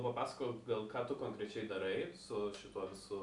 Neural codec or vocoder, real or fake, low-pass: none; real; 10.8 kHz